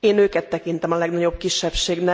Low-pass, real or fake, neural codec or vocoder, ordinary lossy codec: none; real; none; none